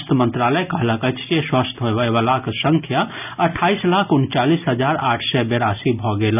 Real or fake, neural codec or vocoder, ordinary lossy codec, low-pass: real; none; none; 3.6 kHz